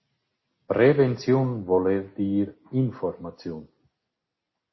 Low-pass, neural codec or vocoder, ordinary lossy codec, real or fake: 7.2 kHz; none; MP3, 24 kbps; real